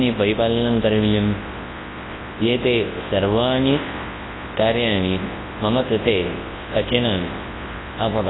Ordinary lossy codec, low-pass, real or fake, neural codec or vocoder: AAC, 16 kbps; 7.2 kHz; fake; codec, 24 kHz, 0.9 kbps, WavTokenizer, large speech release